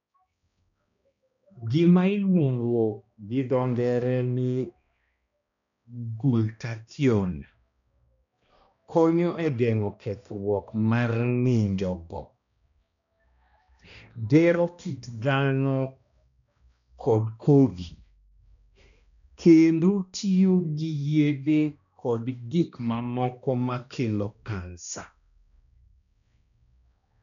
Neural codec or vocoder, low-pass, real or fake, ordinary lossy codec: codec, 16 kHz, 1 kbps, X-Codec, HuBERT features, trained on balanced general audio; 7.2 kHz; fake; none